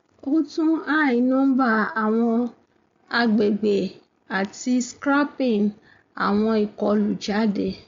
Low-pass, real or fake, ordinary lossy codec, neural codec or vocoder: 7.2 kHz; real; MP3, 48 kbps; none